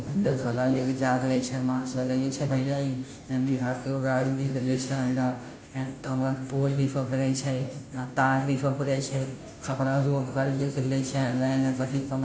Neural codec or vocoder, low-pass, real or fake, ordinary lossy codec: codec, 16 kHz, 0.5 kbps, FunCodec, trained on Chinese and English, 25 frames a second; none; fake; none